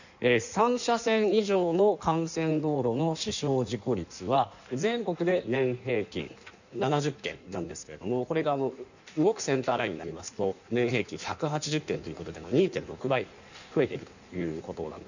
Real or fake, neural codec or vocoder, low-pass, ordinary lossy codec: fake; codec, 16 kHz in and 24 kHz out, 1.1 kbps, FireRedTTS-2 codec; 7.2 kHz; none